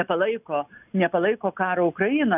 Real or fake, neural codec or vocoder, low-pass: real; none; 3.6 kHz